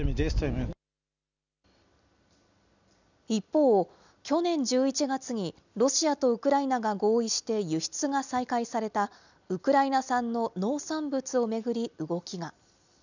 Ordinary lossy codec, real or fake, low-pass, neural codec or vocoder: none; real; 7.2 kHz; none